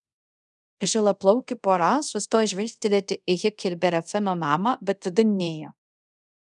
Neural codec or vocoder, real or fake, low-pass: codec, 24 kHz, 0.5 kbps, DualCodec; fake; 10.8 kHz